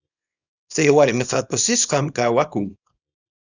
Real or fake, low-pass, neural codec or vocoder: fake; 7.2 kHz; codec, 24 kHz, 0.9 kbps, WavTokenizer, small release